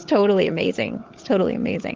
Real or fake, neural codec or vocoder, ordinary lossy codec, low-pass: fake; codec, 16 kHz, 16 kbps, FunCodec, trained on LibriTTS, 50 frames a second; Opus, 16 kbps; 7.2 kHz